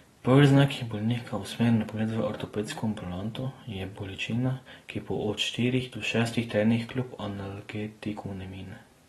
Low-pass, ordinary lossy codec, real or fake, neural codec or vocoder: 19.8 kHz; AAC, 32 kbps; real; none